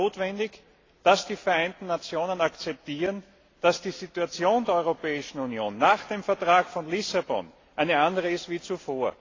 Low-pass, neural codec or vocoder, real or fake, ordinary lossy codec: 7.2 kHz; none; real; AAC, 32 kbps